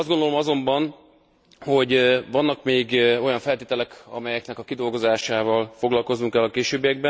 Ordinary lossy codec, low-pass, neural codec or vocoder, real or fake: none; none; none; real